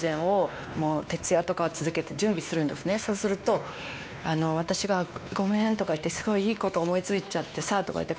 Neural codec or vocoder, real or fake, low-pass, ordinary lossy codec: codec, 16 kHz, 2 kbps, X-Codec, WavLM features, trained on Multilingual LibriSpeech; fake; none; none